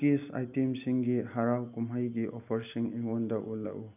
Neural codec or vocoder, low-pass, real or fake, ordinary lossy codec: none; 3.6 kHz; real; none